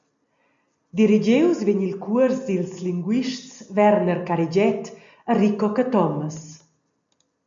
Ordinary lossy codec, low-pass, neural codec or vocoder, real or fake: MP3, 64 kbps; 7.2 kHz; none; real